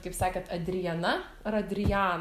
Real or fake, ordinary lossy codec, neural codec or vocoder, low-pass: real; Opus, 64 kbps; none; 14.4 kHz